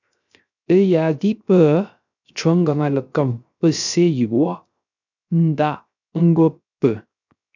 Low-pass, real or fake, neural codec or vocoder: 7.2 kHz; fake; codec, 16 kHz, 0.3 kbps, FocalCodec